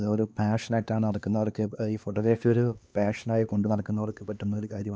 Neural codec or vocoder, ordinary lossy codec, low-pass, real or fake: codec, 16 kHz, 2 kbps, X-Codec, HuBERT features, trained on LibriSpeech; none; none; fake